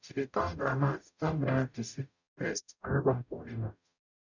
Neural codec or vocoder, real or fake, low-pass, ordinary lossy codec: codec, 44.1 kHz, 0.9 kbps, DAC; fake; 7.2 kHz; none